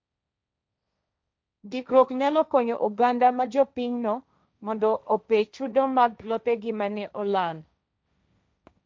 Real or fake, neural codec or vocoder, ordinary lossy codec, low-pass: fake; codec, 16 kHz, 1.1 kbps, Voila-Tokenizer; none; none